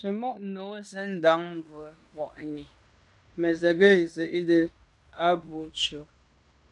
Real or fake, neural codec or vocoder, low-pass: fake; codec, 16 kHz in and 24 kHz out, 0.9 kbps, LongCat-Audio-Codec, fine tuned four codebook decoder; 10.8 kHz